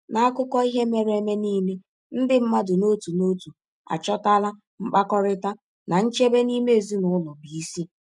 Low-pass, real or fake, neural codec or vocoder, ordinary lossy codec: 10.8 kHz; real; none; none